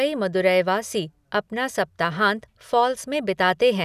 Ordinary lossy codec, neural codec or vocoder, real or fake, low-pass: none; none; real; 14.4 kHz